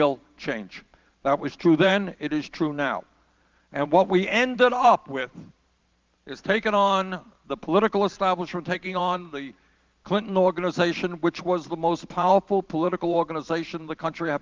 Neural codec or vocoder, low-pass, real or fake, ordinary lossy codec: vocoder, 44.1 kHz, 128 mel bands every 512 samples, BigVGAN v2; 7.2 kHz; fake; Opus, 32 kbps